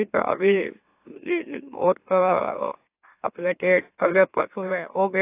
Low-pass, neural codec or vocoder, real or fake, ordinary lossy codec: 3.6 kHz; autoencoder, 44.1 kHz, a latent of 192 numbers a frame, MeloTTS; fake; AAC, 24 kbps